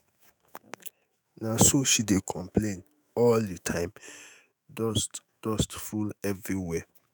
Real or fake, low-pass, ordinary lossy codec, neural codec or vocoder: fake; none; none; autoencoder, 48 kHz, 128 numbers a frame, DAC-VAE, trained on Japanese speech